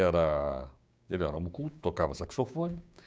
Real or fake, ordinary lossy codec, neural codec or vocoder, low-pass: fake; none; codec, 16 kHz, 6 kbps, DAC; none